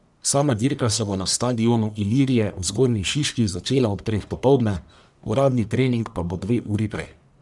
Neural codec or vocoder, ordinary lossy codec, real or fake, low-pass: codec, 44.1 kHz, 1.7 kbps, Pupu-Codec; none; fake; 10.8 kHz